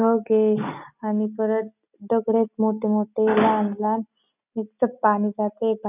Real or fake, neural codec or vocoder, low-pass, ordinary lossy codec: real; none; 3.6 kHz; none